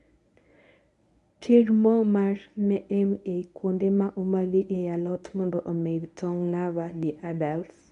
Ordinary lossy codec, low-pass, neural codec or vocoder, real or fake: none; 10.8 kHz; codec, 24 kHz, 0.9 kbps, WavTokenizer, medium speech release version 1; fake